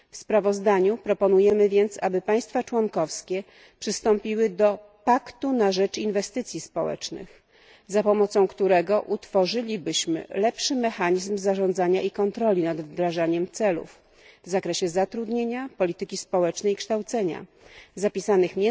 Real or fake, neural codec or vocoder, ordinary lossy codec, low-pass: real; none; none; none